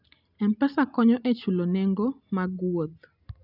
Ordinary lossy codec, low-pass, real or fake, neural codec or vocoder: none; 5.4 kHz; real; none